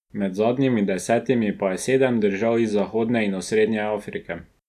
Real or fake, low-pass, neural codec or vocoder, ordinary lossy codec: real; 14.4 kHz; none; none